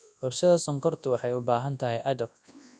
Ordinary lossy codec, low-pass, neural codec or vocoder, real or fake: none; 9.9 kHz; codec, 24 kHz, 0.9 kbps, WavTokenizer, large speech release; fake